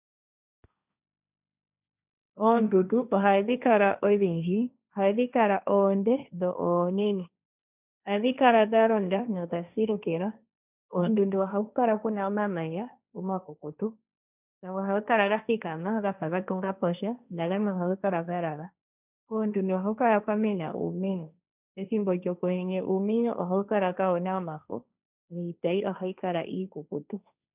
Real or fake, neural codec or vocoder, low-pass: fake; codec, 16 kHz, 1.1 kbps, Voila-Tokenizer; 3.6 kHz